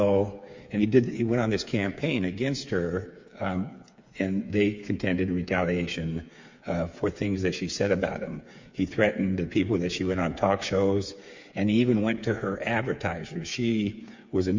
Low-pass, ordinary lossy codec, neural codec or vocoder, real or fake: 7.2 kHz; MP3, 48 kbps; codec, 16 kHz, 8 kbps, FreqCodec, smaller model; fake